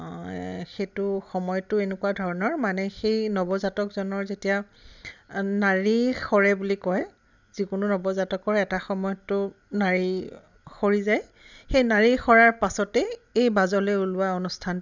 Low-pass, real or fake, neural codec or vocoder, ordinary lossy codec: 7.2 kHz; real; none; none